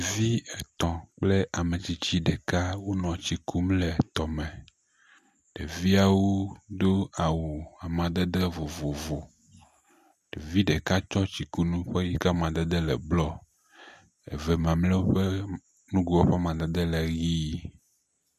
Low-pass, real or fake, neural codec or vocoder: 14.4 kHz; real; none